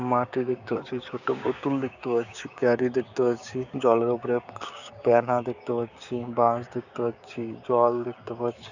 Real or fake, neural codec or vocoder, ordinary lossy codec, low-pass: fake; codec, 16 kHz, 6 kbps, DAC; none; 7.2 kHz